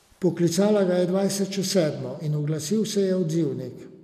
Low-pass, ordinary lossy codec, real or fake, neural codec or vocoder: 14.4 kHz; none; real; none